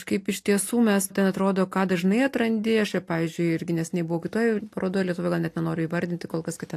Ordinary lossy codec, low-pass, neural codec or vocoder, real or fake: AAC, 64 kbps; 14.4 kHz; none; real